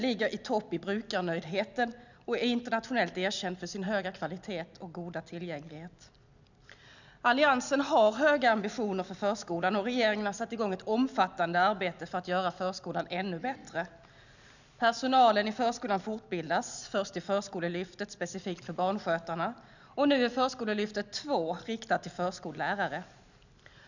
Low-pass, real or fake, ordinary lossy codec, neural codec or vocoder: 7.2 kHz; real; none; none